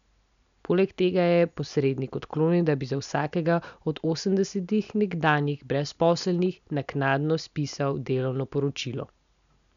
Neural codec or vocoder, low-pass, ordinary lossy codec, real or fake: none; 7.2 kHz; none; real